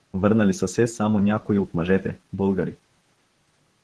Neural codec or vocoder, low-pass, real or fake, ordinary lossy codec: vocoder, 44.1 kHz, 128 mel bands, Pupu-Vocoder; 10.8 kHz; fake; Opus, 16 kbps